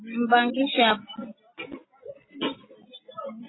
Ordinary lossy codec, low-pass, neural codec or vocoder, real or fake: AAC, 16 kbps; 7.2 kHz; none; real